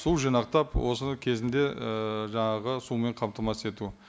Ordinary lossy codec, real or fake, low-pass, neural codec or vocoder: none; real; none; none